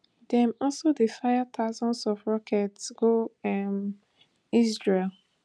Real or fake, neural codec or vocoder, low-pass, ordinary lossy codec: real; none; none; none